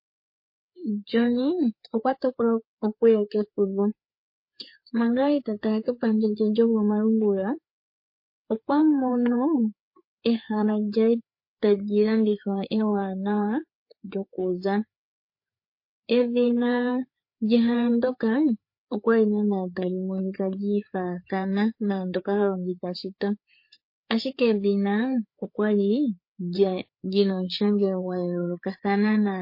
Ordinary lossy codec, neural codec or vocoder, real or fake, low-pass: MP3, 32 kbps; codec, 16 kHz, 4 kbps, FreqCodec, larger model; fake; 5.4 kHz